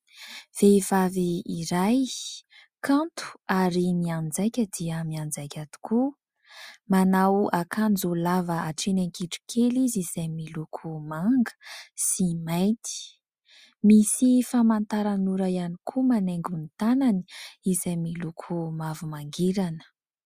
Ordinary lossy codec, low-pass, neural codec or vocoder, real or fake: Opus, 64 kbps; 14.4 kHz; none; real